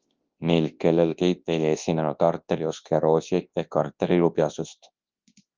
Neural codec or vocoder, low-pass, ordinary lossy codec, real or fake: codec, 24 kHz, 0.9 kbps, WavTokenizer, large speech release; 7.2 kHz; Opus, 32 kbps; fake